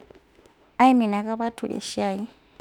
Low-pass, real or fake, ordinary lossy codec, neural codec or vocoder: 19.8 kHz; fake; none; autoencoder, 48 kHz, 32 numbers a frame, DAC-VAE, trained on Japanese speech